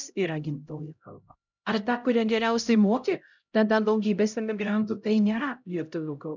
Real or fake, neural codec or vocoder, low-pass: fake; codec, 16 kHz, 0.5 kbps, X-Codec, HuBERT features, trained on LibriSpeech; 7.2 kHz